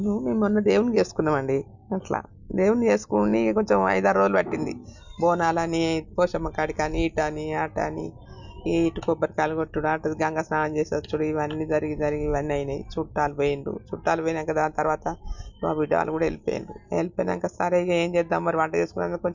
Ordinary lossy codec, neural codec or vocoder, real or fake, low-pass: none; none; real; 7.2 kHz